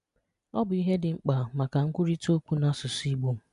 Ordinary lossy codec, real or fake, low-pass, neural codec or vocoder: none; real; 10.8 kHz; none